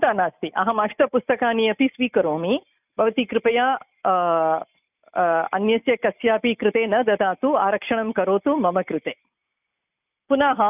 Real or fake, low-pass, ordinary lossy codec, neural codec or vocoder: real; 3.6 kHz; none; none